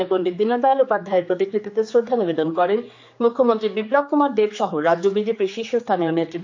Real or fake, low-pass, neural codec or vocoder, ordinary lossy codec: fake; 7.2 kHz; codec, 16 kHz, 4 kbps, X-Codec, HuBERT features, trained on general audio; AAC, 48 kbps